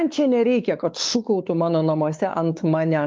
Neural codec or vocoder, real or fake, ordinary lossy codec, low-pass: codec, 16 kHz, 4 kbps, X-Codec, WavLM features, trained on Multilingual LibriSpeech; fake; Opus, 32 kbps; 7.2 kHz